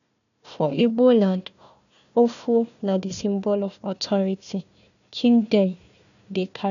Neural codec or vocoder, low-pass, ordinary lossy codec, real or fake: codec, 16 kHz, 1 kbps, FunCodec, trained on Chinese and English, 50 frames a second; 7.2 kHz; none; fake